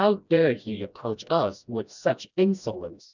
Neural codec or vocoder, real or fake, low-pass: codec, 16 kHz, 1 kbps, FreqCodec, smaller model; fake; 7.2 kHz